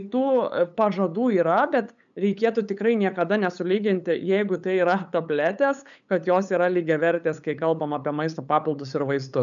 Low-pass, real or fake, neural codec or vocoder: 7.2 kHz; fake; codec, 16 kHz, 8 kbps, FunCodec, trained on LibriTTS, 25 frames a second